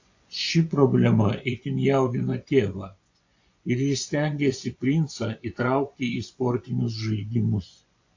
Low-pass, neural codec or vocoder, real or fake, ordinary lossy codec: 7.2 kHz; vocoder, 24 kHz, 100 mel bands, Vocos; fake; AAC, 48 kbps